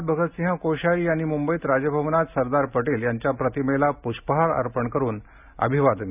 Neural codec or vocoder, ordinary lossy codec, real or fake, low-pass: none; none; real; 3.6 kHz